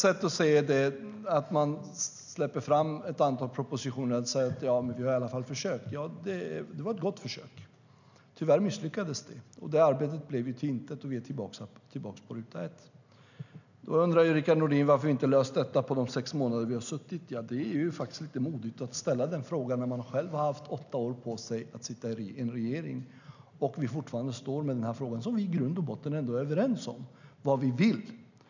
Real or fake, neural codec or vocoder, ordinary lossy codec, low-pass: real; none; none; 7.2 kHz